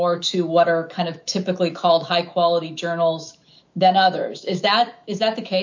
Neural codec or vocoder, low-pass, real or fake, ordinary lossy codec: autoencoder, 48 kHz, 128 numbers a frame, DAC-VAE, trained on Japanese speech; 7.2 kHz; fake; MP3, 48 kbps